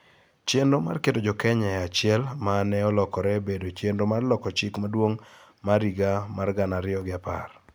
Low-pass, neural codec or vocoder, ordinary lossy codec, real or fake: none; none; none; real